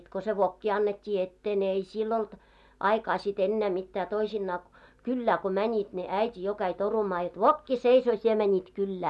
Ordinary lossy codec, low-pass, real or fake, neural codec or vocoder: none; none; real; none